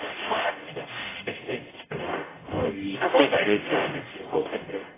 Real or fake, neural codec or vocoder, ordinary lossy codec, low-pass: fake; codec, 44.1 kHz, 0.9 kbps, DAC; AAC, 16 kbps; 3.6 kHz